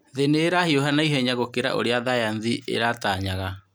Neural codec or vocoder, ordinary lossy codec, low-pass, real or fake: none; none; none; real